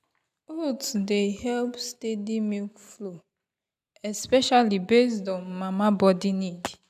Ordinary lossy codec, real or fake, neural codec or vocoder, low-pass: none; real; none; 14.4 kHz